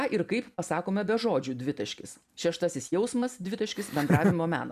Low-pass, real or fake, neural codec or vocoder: 14.4 kHz; real; none